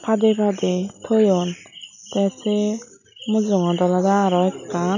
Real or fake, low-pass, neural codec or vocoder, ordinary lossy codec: real; 7.2 kHz; none; none